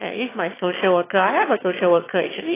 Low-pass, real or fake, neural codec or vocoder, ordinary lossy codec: 3.6 kHz; fake; autoencoder, 22.05 kHz, a latent of 192 numbers a frame, VITS, trained on one speaker; AAC, 16 kbps